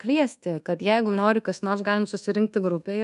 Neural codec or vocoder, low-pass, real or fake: codec, 24 kHz, 1.2 kbps, DualCodec; 10.8 kHz; fake